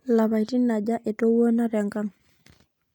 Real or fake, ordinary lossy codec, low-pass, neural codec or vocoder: real; none; 19.8 kHz; none